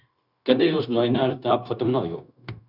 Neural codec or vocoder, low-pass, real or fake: codec, 16 kHz, 0.9 kbps, LongCat-Audio-Codec; 5.4 kHz; fake